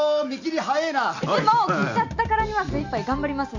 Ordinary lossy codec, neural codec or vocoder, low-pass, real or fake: none; none; 7.2 kHz; real